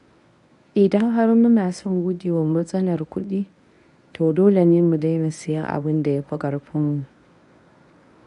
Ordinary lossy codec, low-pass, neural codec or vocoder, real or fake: none; 10.8 kHz; codec, 24 kHz, 0.9 kbps, WavTokenizer, medium speech release version 1; fake